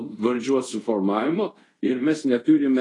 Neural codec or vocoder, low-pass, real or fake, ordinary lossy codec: codec, 24 kHz, 0.5 kbps, DualCodec; 10.8 kHz; fake; AAC, 32 kbps